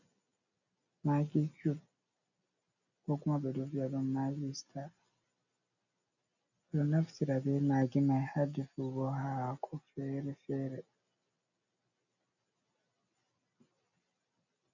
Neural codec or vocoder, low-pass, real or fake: none; 7.2 kHz; real